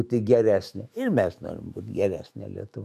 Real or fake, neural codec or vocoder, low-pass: fake; autoencoder, 48 kHz, 128 numbers a frame, DAC-VAE, trained on Japanese speech; 14.4 kHz